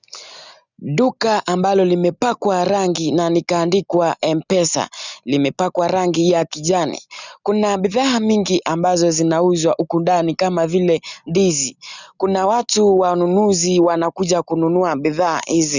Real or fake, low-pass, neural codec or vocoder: real; 7.2 kHz; none